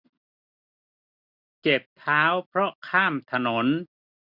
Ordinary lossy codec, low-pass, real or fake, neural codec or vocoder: none; 5.4 kHz; real; none